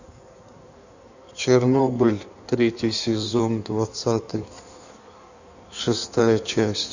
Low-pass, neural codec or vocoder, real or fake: 7.2 kHz; codec, 16 kHz in and 24 kHz out, 1.1 kbps, FireRedTTS-2 codec; fake